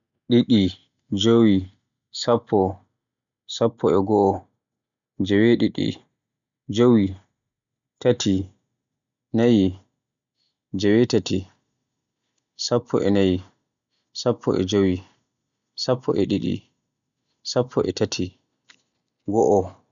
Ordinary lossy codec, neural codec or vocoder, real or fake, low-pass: none; none; real; 7.2 kHz